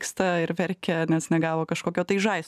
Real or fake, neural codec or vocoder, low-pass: real; none; 14.4 kHz